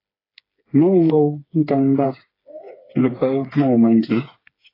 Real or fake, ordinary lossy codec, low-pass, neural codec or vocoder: fake; AAC, 24 kbps; 5.4 kHz; codec, 16 kHz, 4 kbps, FreqCodec, smaller model